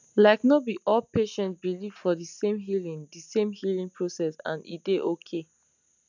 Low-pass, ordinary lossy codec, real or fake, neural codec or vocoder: 7.2 kHz; none; real; none